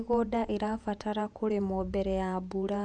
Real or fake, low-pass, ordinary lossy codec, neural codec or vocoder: fake; 10.8 kHz; none; vocoder, 48 kHz, 128 mel bands, Vocos